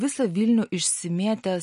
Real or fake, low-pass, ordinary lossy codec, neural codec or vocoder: real; 10.8 kHz; MP3, 48 kbps; none